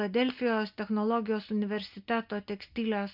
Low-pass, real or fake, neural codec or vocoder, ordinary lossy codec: 5.4 kHz; real; none; MP3, 48 kbps